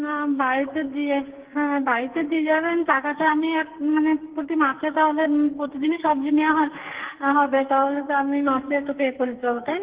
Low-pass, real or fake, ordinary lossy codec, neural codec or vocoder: 3.6 kHz; fake; Opus, 16 kbps; codec, 44.1 kHz, 2.6 kbps, SNAC